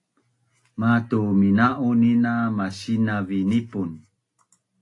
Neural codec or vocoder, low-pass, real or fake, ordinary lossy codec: none; 10.8 kHz; real; AAC, 48 kbps